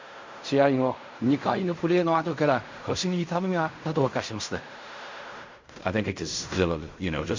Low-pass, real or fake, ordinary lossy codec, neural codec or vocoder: 7.2 kHz; fake; MP3, 64 kbps; codec, 16 kHz in and 24 kHz out, 0.4 kbps, LongCat-Audio-Codec, fine tuned four codebook decoder